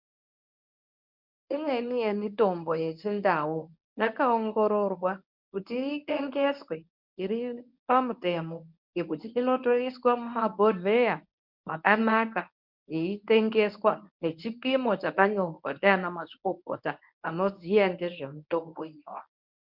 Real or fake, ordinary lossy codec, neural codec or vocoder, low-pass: fake; MP3, 48 kbps; codec, 24 kHz, 0.9 kbps, WavTokenizer, medium speech release version 1; 5.4 kHz